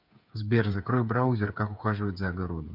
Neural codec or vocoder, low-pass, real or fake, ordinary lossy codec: codec, 16 kHz, 8 kbps, FreqCodec, smaller model; 5.4 kHz; fake; none